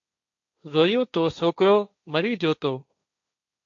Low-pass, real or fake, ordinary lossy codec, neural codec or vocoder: 7.2 kHz; fake; MP3, 64 kbps; codec, 16 kHz, 1.1 kbps, Voila-Tokenizer